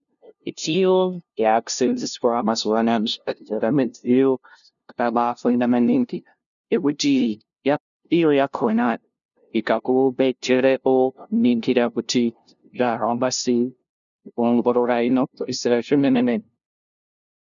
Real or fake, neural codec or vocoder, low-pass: fake; codec, 16 kHz, 0.5 kbps, FunCodec, trained on LibriTTS, 25 frames a second; 7.2 kHz